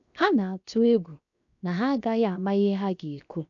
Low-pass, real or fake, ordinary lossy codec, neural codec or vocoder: 7.2 kHz; fake; none; codec, 16 kHz, 0.7 kbps, FocalCodec